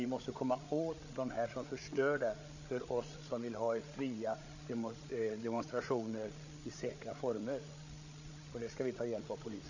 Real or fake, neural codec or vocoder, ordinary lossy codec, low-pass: fake; codec, 16 kHz, 8 kbps, FreqCodec, larger model; none; 7.2 kHz